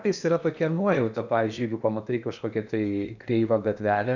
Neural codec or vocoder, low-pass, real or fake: codec, 16 kHz in and 24 kHz out, 0.8 kbps, FocalCodec, streaming, 65536 codes; 7.2 kHz; fake